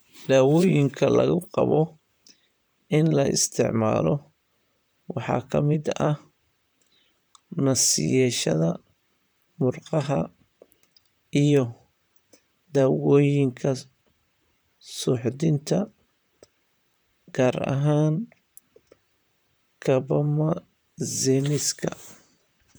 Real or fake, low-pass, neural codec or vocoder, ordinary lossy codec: fake; none; vocoder, 44.1 kHz, 128 mel bands, Pupu-Vocoder; none